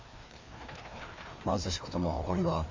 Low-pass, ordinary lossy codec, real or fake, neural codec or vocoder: 7.2 kHz; MP3, 48 kbps; fake; codec, 16 kHz, 2 kbps, FreqCodec, larger model